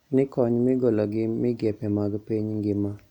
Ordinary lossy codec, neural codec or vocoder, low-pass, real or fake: none; none; 19.8 kHz; real